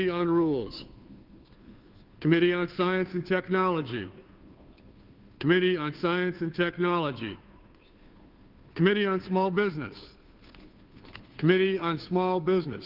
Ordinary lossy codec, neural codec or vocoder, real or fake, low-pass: Opus, 32 kbps; codec, 16 kHz, 2 kbps, FunCodec, trained on Chinese and English, 25 frames a second; fake; 5.4 kHz